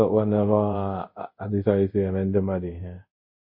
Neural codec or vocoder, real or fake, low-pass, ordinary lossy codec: codec, 24 kHz, 0.5 kbps, DualCodec; fake; 5.4 kHz; MP3, 24 kbps